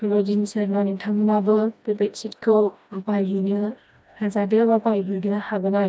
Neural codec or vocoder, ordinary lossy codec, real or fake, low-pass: codec, 16 kHz, 1 kbps, FreqCodec, smaller model; none; fake; none